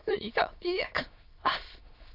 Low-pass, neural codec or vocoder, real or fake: 5.4 kHz; autoencoder, 22.05 kHz, a latent of 192 numbers a frame, VITS, trained on many speakers; fake